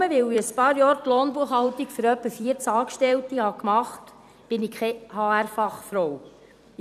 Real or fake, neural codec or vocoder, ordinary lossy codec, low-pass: fake; vocoder, 44.1 kHz, 128 mel bands every 256 samples, BigVGAN v2; none; 14.4 kHz